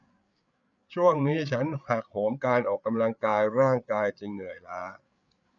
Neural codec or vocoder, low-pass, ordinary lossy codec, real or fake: codec, 16 kHz, 8 kbps, FreqCodec, larger model; 7.2 kHz; none; fake